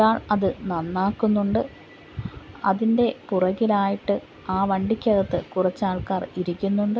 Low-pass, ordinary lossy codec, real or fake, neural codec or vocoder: none; none; real; none